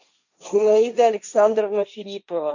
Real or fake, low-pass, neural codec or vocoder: fake; 7.2 kHz; codec, 16 kHz, 1.1 kbps, Voila-Tokenizer